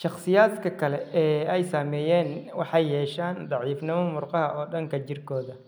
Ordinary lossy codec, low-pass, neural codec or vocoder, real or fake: none; none; none; real